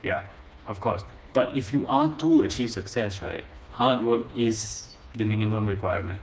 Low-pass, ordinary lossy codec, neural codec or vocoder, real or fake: none; none; codec, 16 kHz, 2 kbps, FreqCodec, smaller model; fake